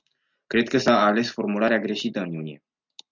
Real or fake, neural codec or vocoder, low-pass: real; none; 7.2 kHz